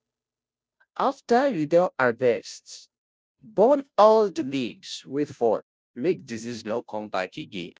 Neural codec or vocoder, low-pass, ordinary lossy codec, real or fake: codec, 16 kHz, 0.5 kbps, FunCodec, trained on Chinese and English, 25 frames a second; none; none; fake